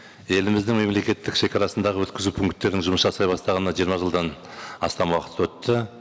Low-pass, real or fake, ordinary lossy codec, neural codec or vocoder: none; real; none; none